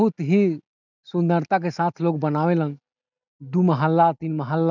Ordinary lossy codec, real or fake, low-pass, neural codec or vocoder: none; real; 7.2 kHz; none